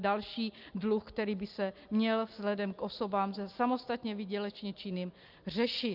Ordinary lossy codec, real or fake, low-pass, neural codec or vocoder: Opus, 24 kbps; real; 5.4 kHz; none